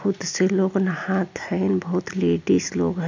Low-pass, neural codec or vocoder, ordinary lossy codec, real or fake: 7.2 kHz; none; MP3, 64 kbps; real